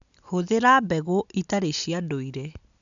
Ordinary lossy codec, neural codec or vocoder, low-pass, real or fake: none; none; 7.2 kHz; real